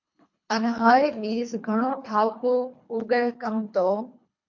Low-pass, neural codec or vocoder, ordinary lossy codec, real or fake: 7.2 kHz; codec, 24 kHz, 3 kbps, HILCodec; MP3, 48 kbps; fake